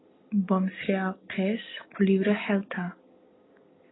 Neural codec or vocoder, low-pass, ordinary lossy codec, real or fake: none; 7.2 kHz; AAC, 16 kbps; real